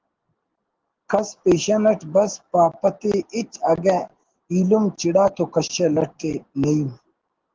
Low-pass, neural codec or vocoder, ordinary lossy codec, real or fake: 7.2 kHz; none; Opus, 16 kbps; real